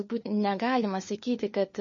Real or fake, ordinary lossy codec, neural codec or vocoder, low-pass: fake; MP3, 32 kbps; codec, 16 kHz, 4 kbps, FunCodec, trained on LibriTTS, 50 frames a second; 7.2 kHz